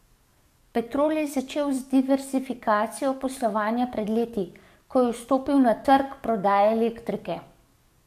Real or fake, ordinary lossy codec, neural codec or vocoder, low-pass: fake; MP3, 64 kbps; codec, 44.1 kHz, 7.8 kbps, DAC; 14.4 kHz